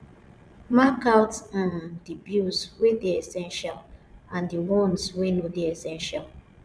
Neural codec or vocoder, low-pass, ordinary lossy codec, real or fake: vocoder, 22.05 kHz, 80 mel bands, Vocos; none; none; fake